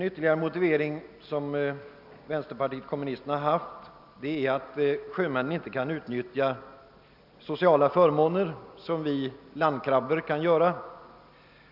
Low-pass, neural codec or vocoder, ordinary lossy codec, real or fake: 5.4 kHz; none; none; real